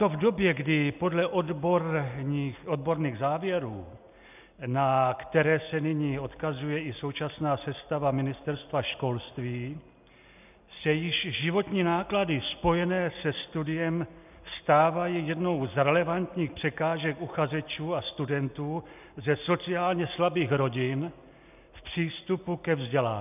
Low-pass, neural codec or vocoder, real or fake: 3.6 kHz; none; real